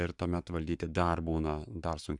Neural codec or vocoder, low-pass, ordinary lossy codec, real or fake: codec, 44.1 kHz, 7.8 kbps, Pupu-Codec; 10.8 kHz; Opus, 64 kbps; fake